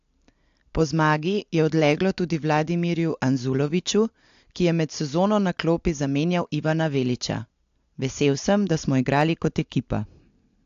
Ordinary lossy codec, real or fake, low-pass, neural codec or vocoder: AAC, 64 kbps; real; 7.2 kHz; none